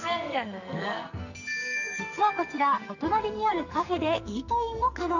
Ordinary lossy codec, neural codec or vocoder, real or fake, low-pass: none; codec, 44.1 kHz, 2.6 kbps, SNAC; fake; 7.2 kHz